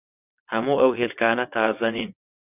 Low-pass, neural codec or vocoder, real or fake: 3.6 kHz; vocoder, 22.05 kHz, 80 mel bands, WaveNeXt; fake